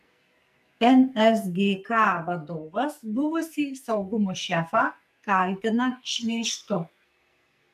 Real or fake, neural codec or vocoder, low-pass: fake; codec, 44.1 kHz, 2.6 kbps, SNAC; 14.4 kHz